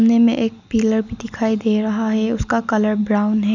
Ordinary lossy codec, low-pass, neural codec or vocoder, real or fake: none; 7.2 kHz; none; real